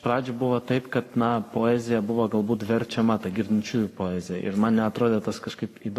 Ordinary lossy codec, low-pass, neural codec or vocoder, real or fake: AAC, 48 kbps; 14.4 kHz; codec, 44.1 kHz, 7.8 kbps, Pupu-Codec; fake